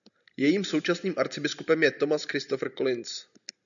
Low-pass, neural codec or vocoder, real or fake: 7.2 kHz; none; real